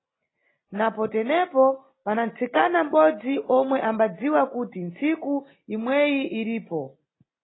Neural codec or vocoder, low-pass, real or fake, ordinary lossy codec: none; 7.2 kHz; real; AAC, 16 kbps